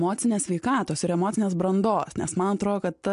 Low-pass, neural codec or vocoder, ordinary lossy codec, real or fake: 10.8 kHz; none; MP3, 64 kbps; real